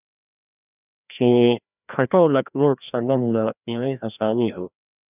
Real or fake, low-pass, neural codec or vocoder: fake; 3.6 kHz; codec, 16 kHz, 1 kbps, FreqCodec, larger model